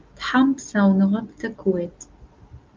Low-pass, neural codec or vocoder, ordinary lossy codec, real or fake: 7.2 kHz; none; Opus, 24 kbps; real